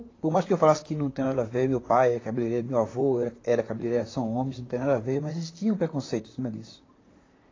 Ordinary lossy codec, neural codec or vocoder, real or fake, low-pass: AAC, 32 kbps; vocoder, 44.1 kHz, 128 mel bands, Pupu-Vocoder; fake; 7.2 kHz